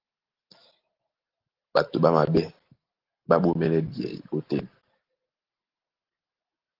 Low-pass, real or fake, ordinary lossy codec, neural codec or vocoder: 5.4 kHz; real; Opus, 16 kbps; none